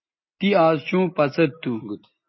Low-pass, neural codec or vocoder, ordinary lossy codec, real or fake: 7.2 kHz; none; MP3, 24 kbps; real